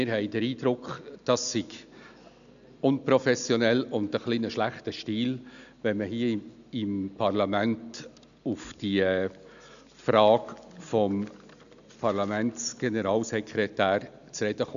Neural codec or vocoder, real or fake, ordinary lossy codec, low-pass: none; real; none; 7.2 kHz